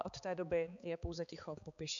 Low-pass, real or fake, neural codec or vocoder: 7.2 kHz; fake; codec, 16 kHz, 4 kbps, X-Codec, HuBERT features, trained on balanced general audio